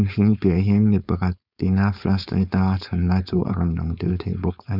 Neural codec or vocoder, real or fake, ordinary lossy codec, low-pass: codec, 16 kHz, 4.8 kbps, FACodec; fake; none; 5.4 kHz